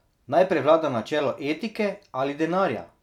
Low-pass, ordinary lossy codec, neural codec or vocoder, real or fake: 19.8 kHz; none; vocoder, 44.1 kHz, 128 mel bands every 256 samples, BigVGAN v2; fake